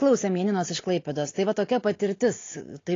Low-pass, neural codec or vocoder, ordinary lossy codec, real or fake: 7.2 kHz; none; AAC, 32 kbps; real